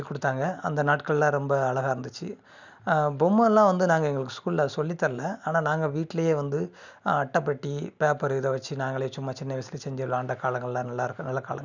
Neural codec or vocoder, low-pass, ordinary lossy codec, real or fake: none; 7.2 kHz; none; real